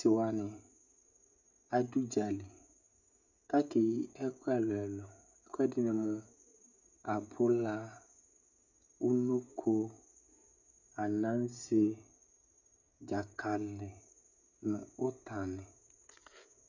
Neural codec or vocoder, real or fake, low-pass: codec, 16 kHz, 16 kbps, FreqCodec, smaller model; fake; 7.2 kHz